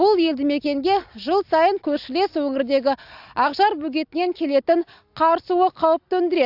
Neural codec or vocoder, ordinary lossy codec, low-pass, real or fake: none; AAC, 48 kbps; 5.4 kHz; real